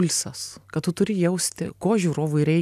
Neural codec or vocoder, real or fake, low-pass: none; real; 14.4 kHz